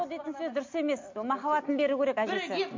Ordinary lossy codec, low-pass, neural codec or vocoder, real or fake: MP3, 48 kbps; 7.2 kHz; none; real